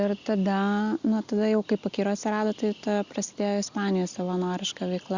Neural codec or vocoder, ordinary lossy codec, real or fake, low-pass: none; Opus, 64 kbps; real; 7.2 kHz